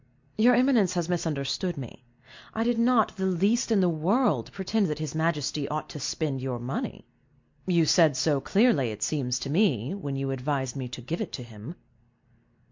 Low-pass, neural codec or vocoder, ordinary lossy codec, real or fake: 7.2 kHz; none; MP3, 48 kbps; real